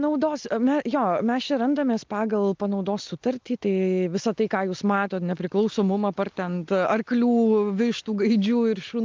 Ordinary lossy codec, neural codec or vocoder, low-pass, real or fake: Opus, 32 kbps; none; 7.2 kHz; real